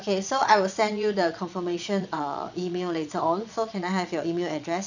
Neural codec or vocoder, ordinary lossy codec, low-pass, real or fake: vocoder, 22.05 kHz, 80 mel bands, Vocos; none; 7.2 kHz; fake